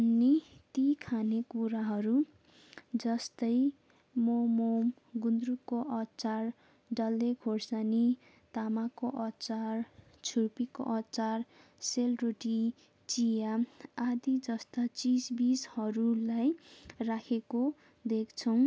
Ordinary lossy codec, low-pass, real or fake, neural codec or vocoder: none; none; real; none